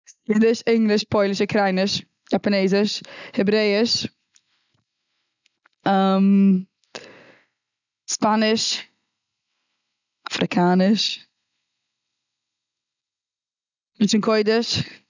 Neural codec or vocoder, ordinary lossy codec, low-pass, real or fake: none; none; 7.2 kHz; real